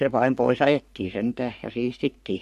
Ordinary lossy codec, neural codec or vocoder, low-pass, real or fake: none; codec, 44.1 kHz, 3.4 kbps, Pupu-Codec; 14.4 kHz; fake